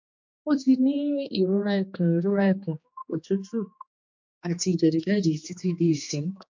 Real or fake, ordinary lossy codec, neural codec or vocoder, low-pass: fake; MP3, 48 kbps; codec, 16 kHz, 2 kbps, X-Codec, HuBERT features, trained on general audio; 7.2 kHz